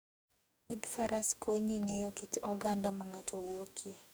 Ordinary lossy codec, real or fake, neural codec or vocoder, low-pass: none; fake; codec, 44.1 kHz, 2.6 kbps, DAC; none